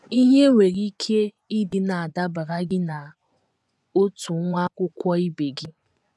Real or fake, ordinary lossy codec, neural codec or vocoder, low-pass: fake; none; vocoder, 24 kHz, 100 mel bands, Vocos; none